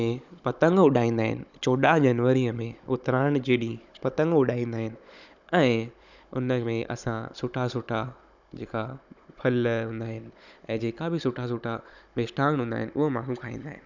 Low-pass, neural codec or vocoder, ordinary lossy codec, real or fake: 7.2 kHz; codec, 16 kHz, 16 kbps, FunCodec, trained on Chinese and English, 50 frames a second; none; fake